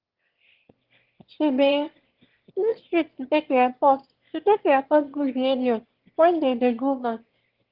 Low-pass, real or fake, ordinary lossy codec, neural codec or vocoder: 5.4 kHz; fake; Opus, 16 kbps; autoencoder, 22.05 kHz, a latent of 192 numbers a frame, VITS, trained on one speaker